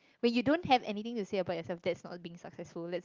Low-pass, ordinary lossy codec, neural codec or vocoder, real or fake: 7.2 kHz; Opus, 32 kbps; none; real